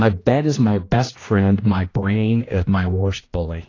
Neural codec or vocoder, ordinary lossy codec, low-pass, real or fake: codec, 16 kHz, 1 kbps, X-Codec, HuBERT features, trained on general audio; AAC, 32 kbps; 7.2 kHz; fake